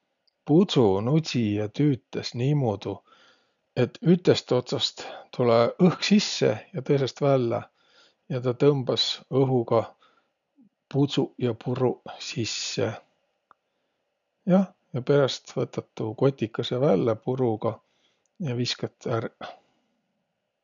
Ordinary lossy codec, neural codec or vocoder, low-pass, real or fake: none; none; 7.2 kHz; real